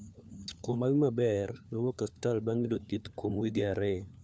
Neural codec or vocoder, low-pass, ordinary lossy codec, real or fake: codec, 16 kHz, 4 kbps, FunCodec, trained on LibriTTS, 50 frames a second; none; none; fake